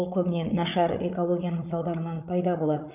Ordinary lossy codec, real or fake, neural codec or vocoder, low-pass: none; fake; codec, 16 kHz, 8 kbps, FreqCodec, larger model; 3.6 kHz